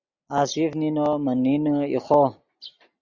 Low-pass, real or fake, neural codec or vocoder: 7.2 kHz; real; none